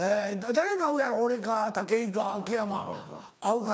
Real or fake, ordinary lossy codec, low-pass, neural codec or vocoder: fake; none; none; codec, 16 kHz, 2 kbps, FreqCodec, larger model